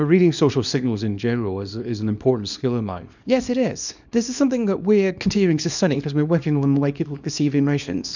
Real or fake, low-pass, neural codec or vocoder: fake; 7.2 kHz; codec, 24 kHz, 0.9 kbps, WavTokenizer, small release